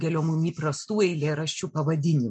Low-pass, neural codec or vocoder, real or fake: 9.9 kHz; none; real